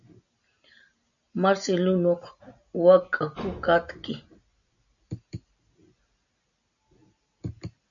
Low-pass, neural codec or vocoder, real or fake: 7.2 kHz; none; real